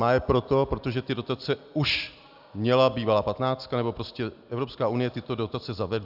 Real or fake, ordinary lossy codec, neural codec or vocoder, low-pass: real; AAC, 48 kbps; none; 5.4 kHz